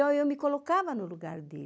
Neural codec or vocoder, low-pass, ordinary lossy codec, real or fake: none; none; none; real